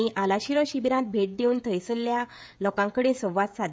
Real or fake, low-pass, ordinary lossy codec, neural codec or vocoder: fake; none; none; codec, 16 kHz, 16 kbps, FreqCodec, smaller model